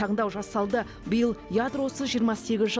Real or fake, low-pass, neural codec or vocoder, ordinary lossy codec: real; none; none; none